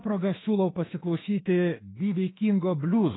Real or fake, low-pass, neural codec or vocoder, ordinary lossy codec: fake; 7.2 kHz; autoencoder, 48 kHz, 32 numbers a frame, DAC-VAE, trained on Japanese speech; AAC, 16 kbps